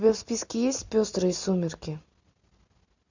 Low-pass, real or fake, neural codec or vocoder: 7.2 kHz; real; none